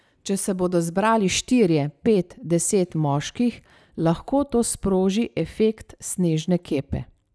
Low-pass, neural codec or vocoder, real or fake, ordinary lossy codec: none; none; real; none